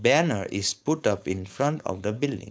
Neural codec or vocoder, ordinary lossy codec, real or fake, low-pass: codec, 16 kHz, 4.8 kbps, FACodec; none; fake; none